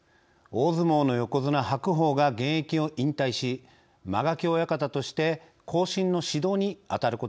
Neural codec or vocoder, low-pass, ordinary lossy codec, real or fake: none; none; none; real